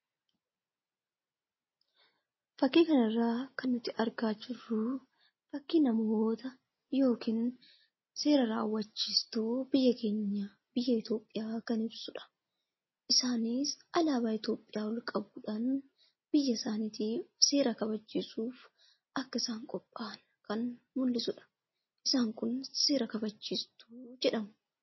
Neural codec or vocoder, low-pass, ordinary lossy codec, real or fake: none; 7.2 kHz; MP3, 24 kbps; real